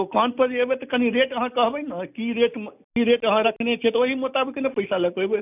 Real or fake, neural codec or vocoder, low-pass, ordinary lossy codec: real; none; 3.6 kHz; none